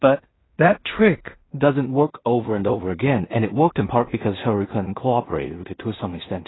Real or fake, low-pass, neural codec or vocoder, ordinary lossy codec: fake; 7.2 kHz; codec, 16 kHz in and 24 kHz out, 0.4 kbps, LongCat-Audio-Codec, two codebook decoder; AAC, 16 kbps